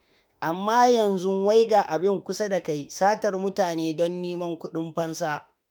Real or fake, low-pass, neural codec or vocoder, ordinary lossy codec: fake; none; autoencoder, 48 kHz, 32 numbers a frame, DAC-VAE, trained on Japanese speech; none